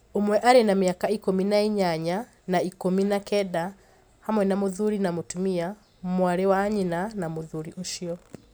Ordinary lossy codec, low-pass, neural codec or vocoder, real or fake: none; none; none; real